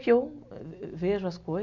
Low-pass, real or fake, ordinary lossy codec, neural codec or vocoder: 7.2 kHz; real; none; none